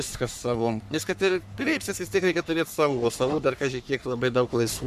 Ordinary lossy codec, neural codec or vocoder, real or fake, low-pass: MP3, 96 kbps; codec, 44.1 kHz, 3.4 kbps, Pupu-Codec; fake; 14.4 kHz